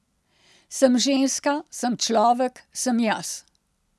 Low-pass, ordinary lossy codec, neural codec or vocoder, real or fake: none; none; none; real